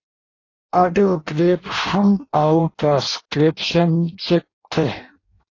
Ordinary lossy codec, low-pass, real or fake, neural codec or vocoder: AAC, 32 kbps; 7.2 kHz; fake; codec, 16 kHz in and 24 kHz out, 0.6 kbps, FireRedTTS-2 codec